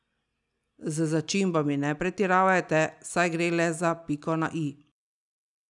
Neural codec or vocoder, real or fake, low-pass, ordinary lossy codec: none; real; 10.8 kHz; none